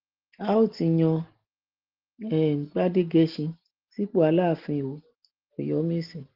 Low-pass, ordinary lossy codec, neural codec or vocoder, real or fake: 5.4 kHz; Opus, 16 kbps; none; real